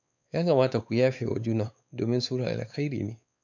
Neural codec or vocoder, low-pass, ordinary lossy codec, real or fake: codec, 16 kHz, 4 kbps, X-Codec, WavLM features, trained on Multilingual LibriSpeech; 7.2 kHz; none; fake